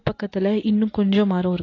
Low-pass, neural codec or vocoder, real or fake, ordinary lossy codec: 7.2 kHz; none; real; AAC, 32 kbps